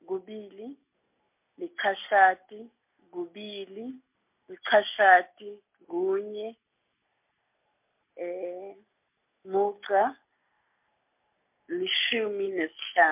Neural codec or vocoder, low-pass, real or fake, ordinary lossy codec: none; 3.6 kHz; real; MP3, 32 kbps